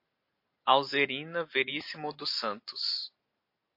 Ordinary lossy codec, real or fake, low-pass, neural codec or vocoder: MP3, 32 kbps; real; 5.4 kHz; none